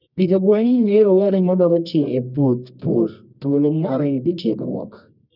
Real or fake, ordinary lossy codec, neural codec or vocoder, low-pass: fake; none; codec, 24 kHz, 0.9 kbps, WavTokenizer, medium music audio release; 5.4 kHz